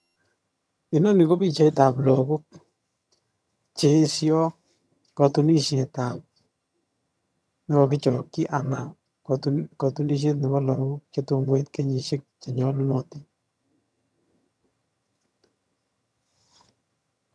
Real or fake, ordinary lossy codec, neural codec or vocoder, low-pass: fake; none; vocoder, 22.05 kHz, 80 mel bands, HiFi-GAN; none